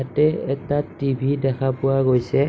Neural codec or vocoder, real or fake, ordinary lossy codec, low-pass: none; real; none; none